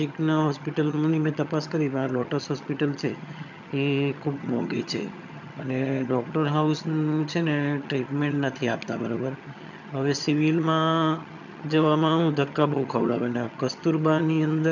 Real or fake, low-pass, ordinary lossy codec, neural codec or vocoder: fake; 7.2 kHz; none; vocoder, 22.05 kHz, 80 mel bands, HiFi-GAN